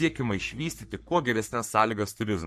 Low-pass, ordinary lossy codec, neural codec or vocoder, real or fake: 14.4 kHz; MP3, 64 kbps; codec, 44.1 kHz, 3.4 kbps, Pupu-Codec; fake